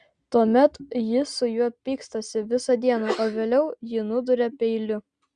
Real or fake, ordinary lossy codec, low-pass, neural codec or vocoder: real; Opus, 64 kbps; 9.9 kHz; none